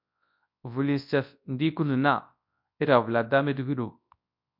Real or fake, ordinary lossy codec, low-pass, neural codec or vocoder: fake; MP3, 48 kbps; 5.4 kHz; codec, 24 kHz, 0.9 kbps, WavTokenizer, large speech release